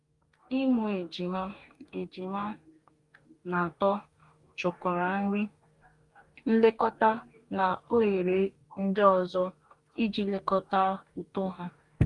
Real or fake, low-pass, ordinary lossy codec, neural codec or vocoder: fake; 10.8 kHz; Opus, 32 kbps; codec, 44.1 kHz, 2.6 kbps, DAC